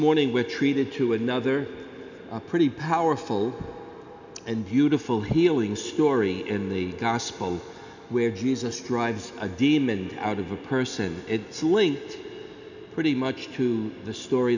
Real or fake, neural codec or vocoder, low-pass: real; none; 7.2 kHz